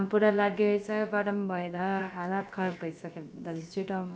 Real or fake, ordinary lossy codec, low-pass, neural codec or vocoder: fake; none; none; codec, 16 kHz, about 1 kbps, DyCAST, with the encoder's durations